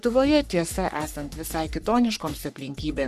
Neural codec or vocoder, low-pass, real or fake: codec, 44.1 kHz, 3.4 kbps, Pupu-Codec; 14.4 kHz; fake